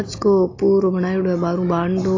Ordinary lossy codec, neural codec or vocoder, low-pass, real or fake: AAC, 32 kbps; none; 7.2 kHz; real